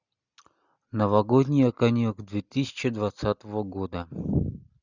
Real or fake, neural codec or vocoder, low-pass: real; none; 7.2 kHz